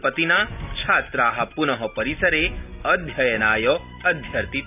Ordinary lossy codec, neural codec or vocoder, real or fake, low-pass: none; none; real; 3.6 kHz